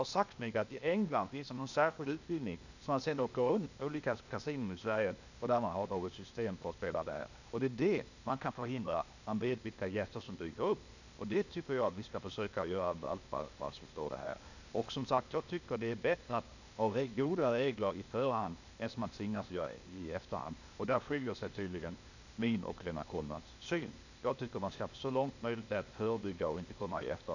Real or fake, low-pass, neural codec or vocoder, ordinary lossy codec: fake; 7.2 kHz; codec, 16 kHz, 0.8 kbps, ZipCodec; none